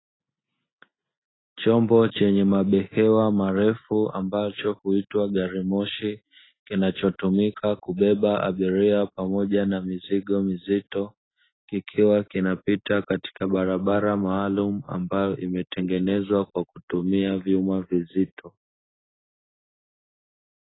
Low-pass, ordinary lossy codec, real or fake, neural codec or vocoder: 7.2 kHz; AAC, 16 kbps; real; none